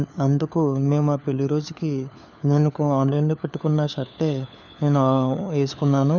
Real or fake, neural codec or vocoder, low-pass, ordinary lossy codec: fake; codec, 16 kHz, 4 kbps, FunCodec, trained on LibriTTS, 50 frames a second; 7.2 kHz; none